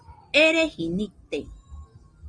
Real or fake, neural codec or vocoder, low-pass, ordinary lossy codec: real; none; 9.9 kHz; Opus, 24 kbps